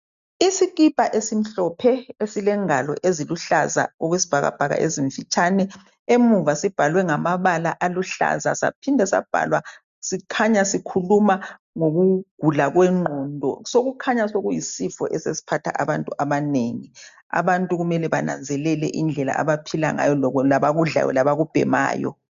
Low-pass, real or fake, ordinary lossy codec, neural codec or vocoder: 7.2 kHz; real; MP3, 64 kbps; none